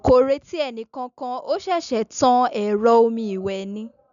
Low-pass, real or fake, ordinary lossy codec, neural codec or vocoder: 7.2 kHz; real; none; none